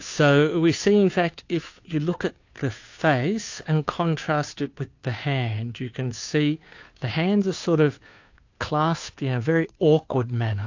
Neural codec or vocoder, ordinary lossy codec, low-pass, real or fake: codec, 16 kHz, 2 kbps, FunCodec, trained on Chinese and English, 25 frames a second; AAC, 48 kbps; 7.2 kHz; fake